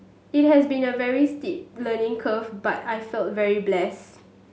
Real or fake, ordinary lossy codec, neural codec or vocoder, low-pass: real; none; none; none